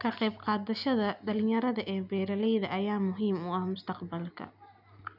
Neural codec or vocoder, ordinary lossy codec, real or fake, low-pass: none; none; real; 5.4 kHz